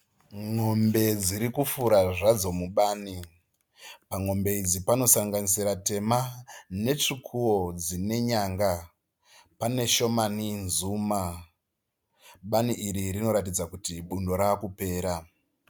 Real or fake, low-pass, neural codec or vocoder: real; 19.8 kHz; none